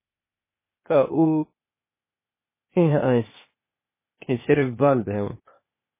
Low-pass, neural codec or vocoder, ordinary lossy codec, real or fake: 3.6 kHz; codec, 16 kHz, 0.8 kbps, ZipCodec; MP3, 16 kbps; fake